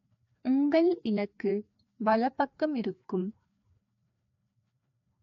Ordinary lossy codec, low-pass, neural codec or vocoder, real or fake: AAC, 48 kbps; 7.2 kHz; codec, 16 kHz, 2 kbps, FreqCodec, larger model; fake